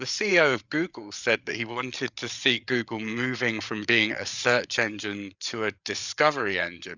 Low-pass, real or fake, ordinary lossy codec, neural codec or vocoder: 7.2 kHz; fake; Opus, 64 kbps; codec, 16 kHz, 8 kbps, FreqCodec, larger model